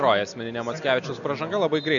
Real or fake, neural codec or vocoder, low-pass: real; none; 7.2 kHz